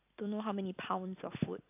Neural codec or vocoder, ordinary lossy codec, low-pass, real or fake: none; AAC, 32 kbps; 3.6 kHz; real